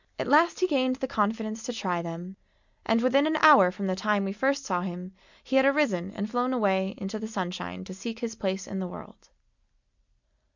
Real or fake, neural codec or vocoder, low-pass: real; none; 7.2 kHz